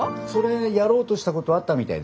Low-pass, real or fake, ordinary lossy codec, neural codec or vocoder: none; real; none; none